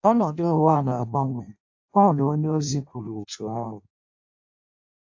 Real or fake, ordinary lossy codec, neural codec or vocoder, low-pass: fake; AAC, 48 kbps; codec, 16 kHz in and 24 kHz out, 0.6 kbps, FireRedTTS-2 codec; 7.2 kHz